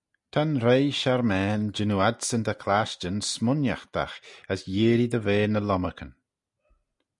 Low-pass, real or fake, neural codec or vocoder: 10.8 kHz; real; none